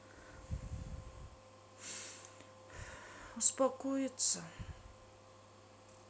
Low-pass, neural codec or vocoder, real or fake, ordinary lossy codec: none; none; real; none